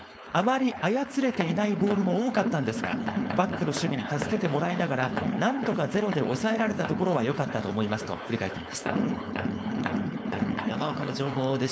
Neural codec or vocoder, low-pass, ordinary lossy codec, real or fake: codec, 16 kHz, 4.8 kbps, FACodec; none; none; fake